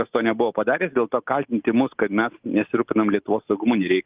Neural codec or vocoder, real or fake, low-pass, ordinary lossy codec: none; real; 3.6 kHz; Opus, 24 kbps